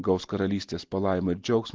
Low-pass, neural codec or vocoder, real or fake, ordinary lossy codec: 7.2 kHz; none; real; Opus, 16 kbps